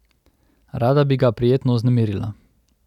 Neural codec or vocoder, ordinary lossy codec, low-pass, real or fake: none; none; 19.8 kHz; real